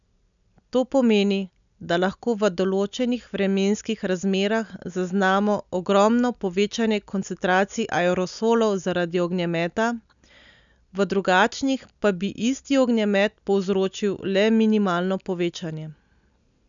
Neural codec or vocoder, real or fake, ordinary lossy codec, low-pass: none; real; none; 7.2 kHz